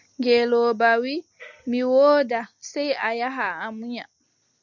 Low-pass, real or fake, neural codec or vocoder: 7.2 kHz; real; none